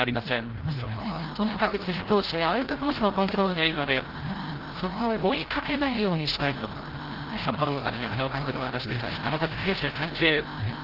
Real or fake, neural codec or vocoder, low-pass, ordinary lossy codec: fake; codec, 16 kHz, 0.5 kbps, FreqCodec, larger model; 5.4 kHz; Opus, 16 kbps